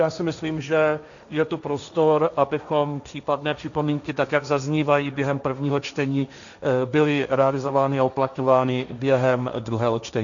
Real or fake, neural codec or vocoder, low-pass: fake; codec, 16 kHz, 1.1 kbps, Voila-Tokenizer; 7.2 kHz